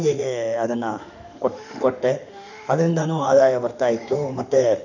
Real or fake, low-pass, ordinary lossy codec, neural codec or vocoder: fake; 7.2 kHz; none; autoencoder, 48 kHz, 32 numbers a frame, DAC-VAE, trained on Japanese speech